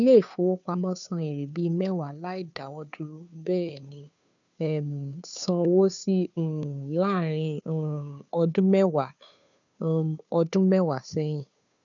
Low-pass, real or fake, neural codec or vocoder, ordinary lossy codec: 7.2 kHz; fake; codec, 16 kHz, 2 kbps, FunCodec, trained on Chinese and English, 25 frames a second; none